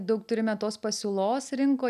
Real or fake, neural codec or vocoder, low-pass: real; none; 14.4 kHz